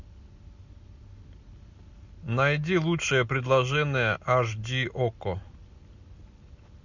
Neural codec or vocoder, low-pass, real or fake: none; 7.2 kHz; real